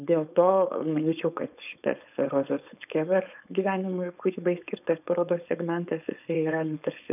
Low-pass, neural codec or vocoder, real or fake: 3.6 kHz; codec, 16 kHz, 4.8 kbps, FACodec; fake